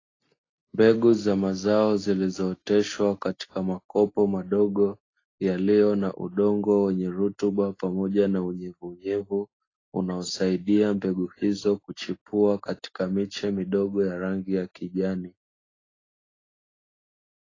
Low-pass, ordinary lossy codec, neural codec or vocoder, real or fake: 7.2 kHz; AAC, 32 kbps; none; real